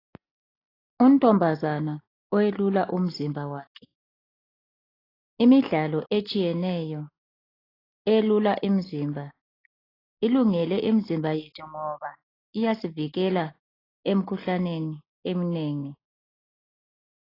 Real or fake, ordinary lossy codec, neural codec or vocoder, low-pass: real; AAC, 24 kbps; none; 5.4 kHz